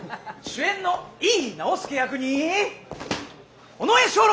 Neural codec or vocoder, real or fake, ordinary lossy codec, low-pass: none; real; none; none